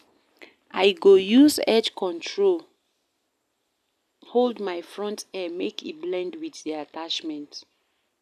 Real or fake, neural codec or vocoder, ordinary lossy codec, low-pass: real; none; none; 14.4 kHz